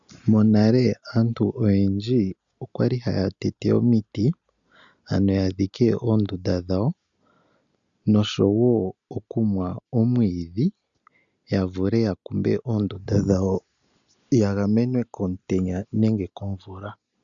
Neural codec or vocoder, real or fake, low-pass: none; real; 7.2 kHz